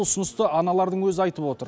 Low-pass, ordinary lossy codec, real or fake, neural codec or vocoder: none; none; real; none